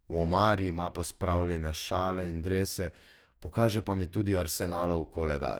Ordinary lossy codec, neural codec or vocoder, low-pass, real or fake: none; codec, 44.1 kHz, 2.6 kbps, DAC; none; fake